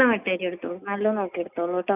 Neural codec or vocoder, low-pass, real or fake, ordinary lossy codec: none; 3.6 kHz; real; none